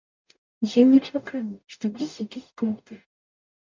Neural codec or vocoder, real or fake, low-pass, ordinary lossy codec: codec, 44.1 kHz, 0.9 kbps, DAC; fake; 7.2 kHz; MP3, 64 kbps